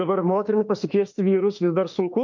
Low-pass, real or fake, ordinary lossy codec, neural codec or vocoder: 7.2 kHz; fake; MP3, 48 kbps; autoencoder, 48 kHz, 32 numbers a frame, DAC-VAE, trained on Japanese speech